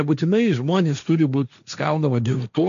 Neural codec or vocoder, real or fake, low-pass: codec, 16 kHz, 1.1 kbps, Voila-Tokenizer; fake; 7.2 kHz